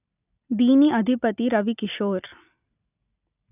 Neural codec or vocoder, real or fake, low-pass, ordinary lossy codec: none; real; 3.6 kHz; none